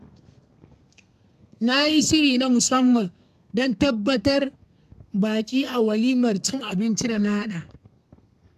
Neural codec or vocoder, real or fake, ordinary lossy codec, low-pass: codec, 32 kHz, 1.9 kbps, SNAC; fake; AAC, 96 kbps; 14.4 kHz